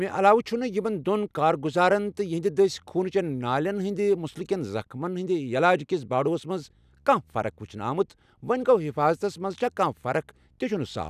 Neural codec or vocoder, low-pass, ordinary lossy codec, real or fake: none; 14.4 kHz; none; real